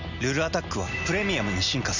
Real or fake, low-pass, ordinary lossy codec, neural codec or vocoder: real; 7.2 kHz; none; none